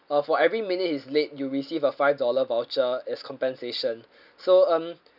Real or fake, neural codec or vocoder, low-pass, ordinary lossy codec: real; none; 5.4 kHz; none